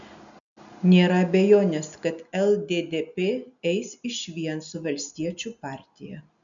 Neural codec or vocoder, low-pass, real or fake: none; 7.2 kHz; real